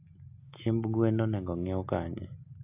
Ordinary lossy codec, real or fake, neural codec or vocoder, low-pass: AAC, 32 kbps; real; none; 3.6 kHz